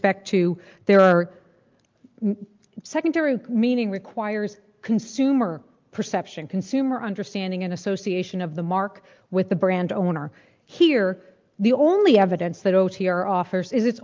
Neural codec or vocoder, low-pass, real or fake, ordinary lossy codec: none; 7.2 kHz; real; Opus, 24 kbps